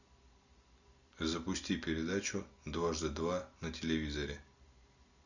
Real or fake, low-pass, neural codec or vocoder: real; 7.2 kHz; none